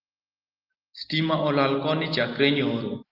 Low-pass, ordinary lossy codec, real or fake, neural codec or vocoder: 5.4 kHz; Opus, 32 kbps; real; none